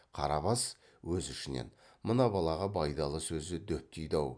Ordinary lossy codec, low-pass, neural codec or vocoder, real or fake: none; 9.9 kHz; none; real